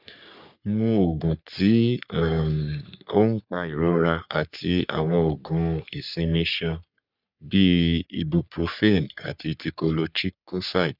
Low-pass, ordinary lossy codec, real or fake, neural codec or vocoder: 5.4 kHz; none; fake; codec, 44.1 kHz, 3.4 kbps, Pupu-Codec